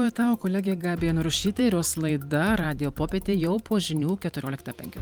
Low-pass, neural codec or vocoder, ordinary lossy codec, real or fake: 19.8 kHz; vocoder, 44.1 kHz, 128 mel bands every 512 samples, BigVGAN v2; Opus, 32 kbps; fake